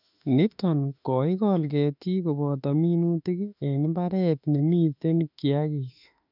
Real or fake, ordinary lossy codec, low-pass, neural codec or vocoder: fake; none; 5.4 kHz; autoencoder, 48 kHz, 32 numbers a frame, DAC-VAE, trained on Japanese speech